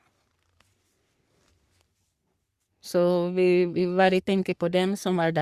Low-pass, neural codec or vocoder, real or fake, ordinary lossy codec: 14.4 kHz; codec, 44.1 kHz, 3.4 kbps, Pupu-Codec; fake; Opus, 64 kbps